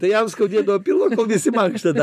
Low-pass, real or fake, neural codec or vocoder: 14.4 kHz; fake; vocoder, 44.1 kHz, 128 mel bands every 256 samples, BigVGAN v2